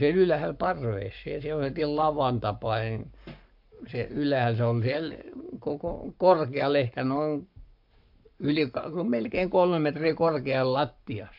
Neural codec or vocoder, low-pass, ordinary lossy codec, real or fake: codec, 44.1 kHz, 7.8 kbps, Pupu-Codec; 5.4 kHz; MP3, 48 kbps; fake